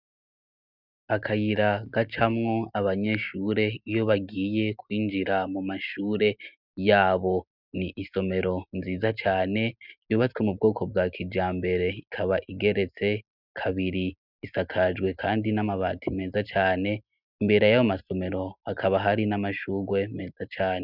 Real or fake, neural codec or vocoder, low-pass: real; none; 5.4 kHz